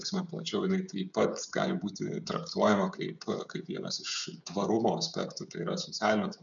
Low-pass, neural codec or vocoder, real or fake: 7.2 kHz; codec, 16 kHz, 16 kbps, FreqCodec, smaller model; fake